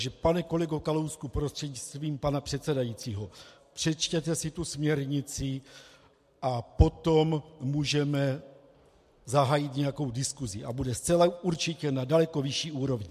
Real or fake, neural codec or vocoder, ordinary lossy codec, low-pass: real; none; MP3, 64 kbps; 14.4 kHz